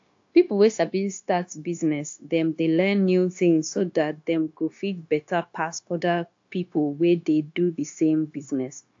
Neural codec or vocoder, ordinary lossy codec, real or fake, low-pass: codec, 16 kHz, 0.9 kbps, LongCat-Audio-Codec; AAC, 48 kbps; fake; 7.2 kHz